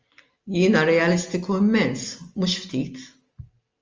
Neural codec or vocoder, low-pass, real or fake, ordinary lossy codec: none; 7.2 kHz; real; Opus, 32 kbps